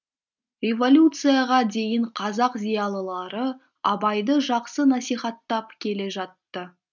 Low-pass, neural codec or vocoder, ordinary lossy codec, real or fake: 7.2 kHz; none; none; real